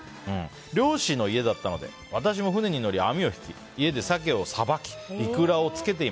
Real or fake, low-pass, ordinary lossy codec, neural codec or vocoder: real; none; none; none